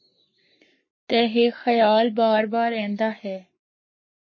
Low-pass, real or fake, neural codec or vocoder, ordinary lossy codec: 7.2 kHz; fake; codec, 44.1 kHz, 7.8 kbps, Pupu-Codec; MP3, 32 kbps